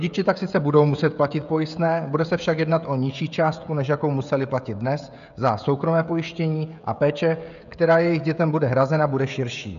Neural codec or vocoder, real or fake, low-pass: codec, 16 kHz, 16 kbps, FreqCodec, smaller model; fake; 7.2 kHz